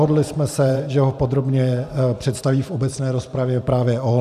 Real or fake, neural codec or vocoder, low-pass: fake; vocoder, 44.1 kHz, 128 mel bands every 512 samples, BigVGAN v2; 14.4 kHz